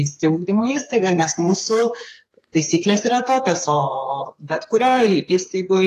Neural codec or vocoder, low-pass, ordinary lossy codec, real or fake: codec, 32 kHz, 1.9 kbps, SNAC; 14.4 kHz; AAC, 48 kbps; fake